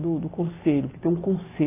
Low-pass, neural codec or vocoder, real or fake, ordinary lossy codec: 3.6 kHz; none; real; AAC, 16 kbps